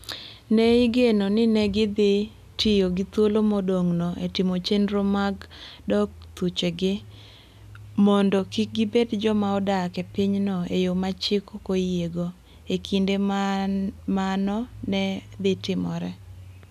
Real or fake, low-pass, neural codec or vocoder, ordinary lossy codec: real; 14.4 kHz; none; none